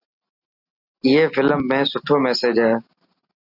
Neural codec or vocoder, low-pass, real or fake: none; 5.4 kHz; real